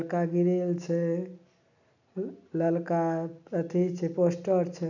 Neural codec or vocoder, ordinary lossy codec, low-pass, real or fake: none; none; 7.2 kHz; real